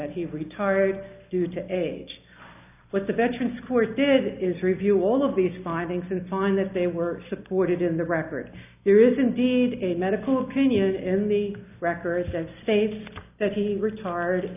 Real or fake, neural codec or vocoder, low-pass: real; none; 3.6 kHz